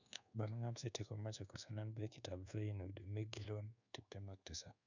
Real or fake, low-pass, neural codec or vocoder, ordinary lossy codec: fake; 7.2 kHz; codec, 24 kHz, 1.2 kbps, DualCodec; none